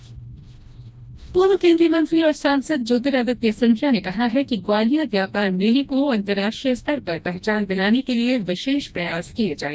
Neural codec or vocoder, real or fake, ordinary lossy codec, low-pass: codec, 16 kHz, 1 kbps, FreqCodec, smaller model; fake; none; none